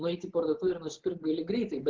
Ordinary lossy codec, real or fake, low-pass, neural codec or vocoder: Opus, 16 kbps; real; 7.2 kHz; none